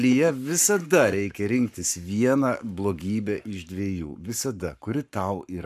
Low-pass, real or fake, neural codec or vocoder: 14.4 kHz; fake; codec, 44.1 kHz, 7.8 kbps, Pupu-Codec